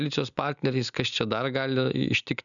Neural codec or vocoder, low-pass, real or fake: none; 7.2 kHz; real